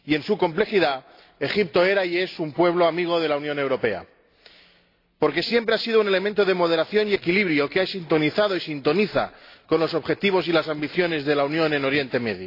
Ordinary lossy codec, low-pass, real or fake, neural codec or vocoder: AAC, 32 kbps; 5.4 kHz; real; none